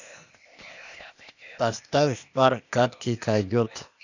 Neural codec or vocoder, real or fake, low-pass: codec, 16 kHz, 0.8 kbps, ZipCodec; fake; 7.2 kHz